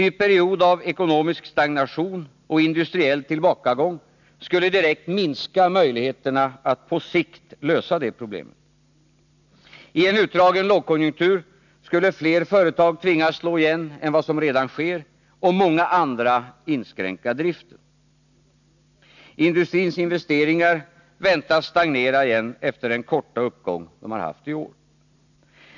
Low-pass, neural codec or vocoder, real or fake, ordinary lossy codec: 7.2 kHz; none; real; none